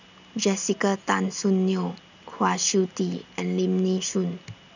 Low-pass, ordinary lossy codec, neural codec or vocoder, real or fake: 7.2 kHz; none; none; real